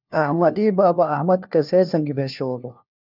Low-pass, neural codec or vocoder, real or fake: 5.4 kHz; codec, 16 kHz, 1 kbps, FunCodec, trained on LibriTTS, 50 frames a second; fake